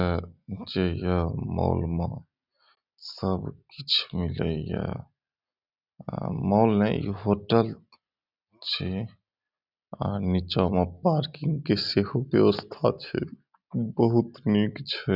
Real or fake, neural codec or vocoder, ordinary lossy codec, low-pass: real; none; none; 5.4 kHz